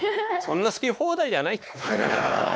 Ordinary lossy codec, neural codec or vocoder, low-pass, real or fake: none; codec, 16 kHz, 2 kbps, X-Codec, WavLM features, trained on Multilingual LibriSpeech; none; fake